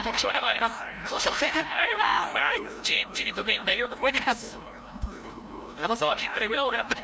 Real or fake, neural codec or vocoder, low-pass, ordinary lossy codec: fake; codec, 16 kHz, 0.5 kbps, FreqCodec, larger model; none; none